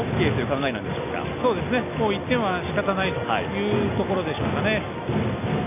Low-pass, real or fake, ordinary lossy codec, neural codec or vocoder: 3.6 kHz; real; none; none